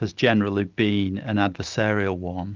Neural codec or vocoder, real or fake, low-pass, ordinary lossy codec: none; real; 7.2 kHz; Opus, 24 kbps